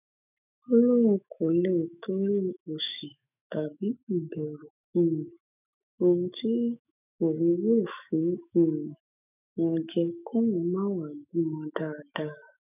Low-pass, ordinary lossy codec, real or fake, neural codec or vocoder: 3.6 kHz; none; fake; autoencoder, 48 kHz, 128 numbers a frame, DAC-VAE, trained on Japanese speech